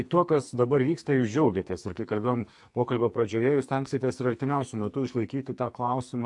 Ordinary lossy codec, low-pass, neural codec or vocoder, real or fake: AAC, 64 kbps; 10.8 kHz; codec, 44.1 kHz, 2.6 kbps, SNAC; fake